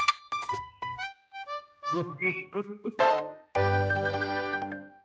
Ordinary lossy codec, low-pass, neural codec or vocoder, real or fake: none; none; codec, 16 kHz, 1 kbps, X-Codec, HuBERT features, trained on general audio; fake